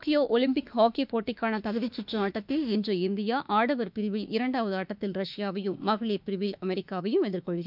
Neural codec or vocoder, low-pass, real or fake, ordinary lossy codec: autoencoder, 48 kHz, 32 numbers a frame, DAC-VAE, trained on Japanese speech; 5.4 kHz; fake; none